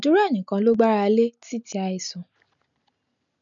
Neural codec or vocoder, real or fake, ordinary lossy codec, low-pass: none; real; none; 7.2 kHz